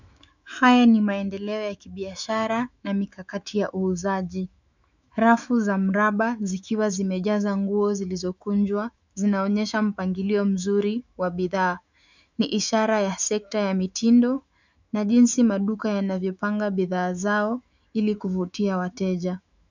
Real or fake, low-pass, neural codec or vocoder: fake; 7.2 kHz; autoencoder, 48 kHz, 128 numbers a frame, DAC-VAE, trained on Japanese speech